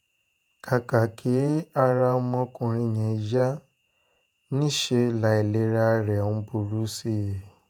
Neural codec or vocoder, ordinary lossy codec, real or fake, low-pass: vocoder, 48 kHz, 128 mel bands, Vocos; none; fake; none